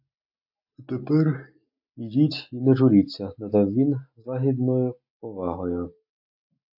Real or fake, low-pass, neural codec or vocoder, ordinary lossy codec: real; 5.4 kHz; none; AAC, 48 kbps